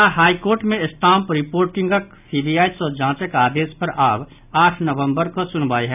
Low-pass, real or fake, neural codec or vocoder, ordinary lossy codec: 3.6 kHz; real; none; none